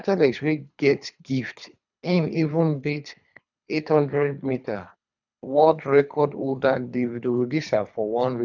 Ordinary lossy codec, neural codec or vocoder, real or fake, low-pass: none; codec, 24 kHz, 3 kbps, HILCodec; fake; 7.2 kHz